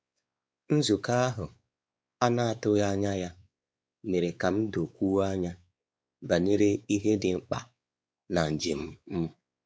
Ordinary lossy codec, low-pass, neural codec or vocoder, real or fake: none; none; codec, 16 kHz, 4 kbps, X-Codec, WavLM features, trained on Multilingual LibriSpeech; fake